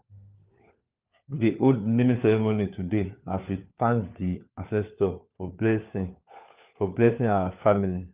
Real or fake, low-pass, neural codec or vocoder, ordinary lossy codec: fake; 3.6 kHz; codec, 16 kHz, 4 kbps, FunCodec, trained on LibriTTS, 50 frames a second; Opus, 24 kbps